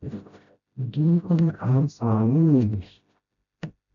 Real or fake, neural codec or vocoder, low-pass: fake; codec, 16 kHz, 0.5 kbps, FreqCodec, smaller model; 7.2 kHz